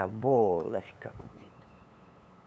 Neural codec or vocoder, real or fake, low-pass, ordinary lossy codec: codec, 16 kHz, 8 kbps, FunCodec, trained on LibriTTS, 25 frames a second; fake; none; none